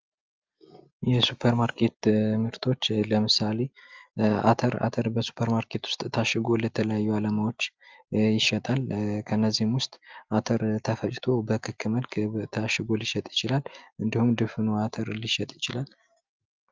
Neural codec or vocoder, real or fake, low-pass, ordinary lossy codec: none; real; 7.2 kHz; Opus, 32 kbps